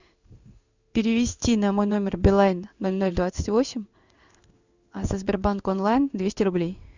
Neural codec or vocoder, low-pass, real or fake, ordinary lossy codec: codec, 16 kHz in and 24 kHz out, 1 kbps, XY-Tokenizer; 7.2 kHz; fake; Opus, 64 kbps